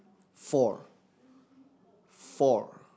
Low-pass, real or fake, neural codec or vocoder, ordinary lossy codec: none; real; none; none